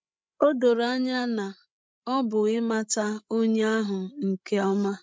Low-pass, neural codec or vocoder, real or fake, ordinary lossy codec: none; codec, 16 kHz, 16 kbps, FreqCodec, larger model; fake; none